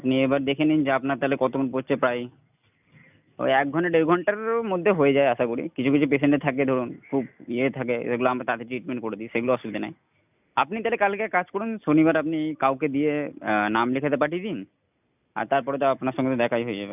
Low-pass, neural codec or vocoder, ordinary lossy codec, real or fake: 3.6 kHz; none; none; real